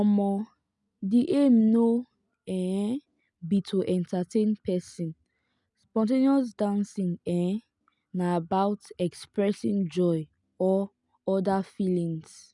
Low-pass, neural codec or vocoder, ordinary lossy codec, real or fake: 10.8 kHz; none; none; real